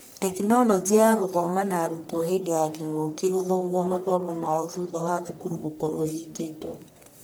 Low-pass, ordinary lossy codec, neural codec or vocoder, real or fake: none; none; codec, 44.1 kHz, 1.7 kbps, Pupu-Codec; fake